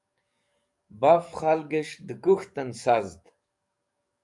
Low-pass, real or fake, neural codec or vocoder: 10.8 kHz; fake; codec, 44.1 kHz, 7.8 kbps, DAC